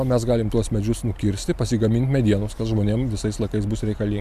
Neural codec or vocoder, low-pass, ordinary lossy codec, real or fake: none; 14.4 kHz; MP3, 96 kbps; real